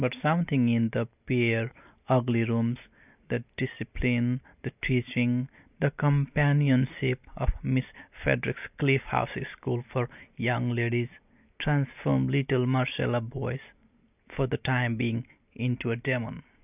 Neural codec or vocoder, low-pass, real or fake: none; 3.6 kHz; real